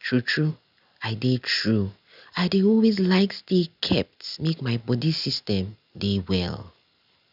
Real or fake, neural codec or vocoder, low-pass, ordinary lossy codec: real; none; 5.4 kHz; none